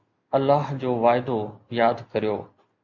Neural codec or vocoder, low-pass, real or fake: none; 7.2 kHz; real